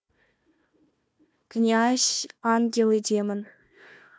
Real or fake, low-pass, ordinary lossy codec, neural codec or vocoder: fake; none; none; codec, 16 kHz, 1 kbps, FunCodec, trained on Chinese and English, 50 frames a second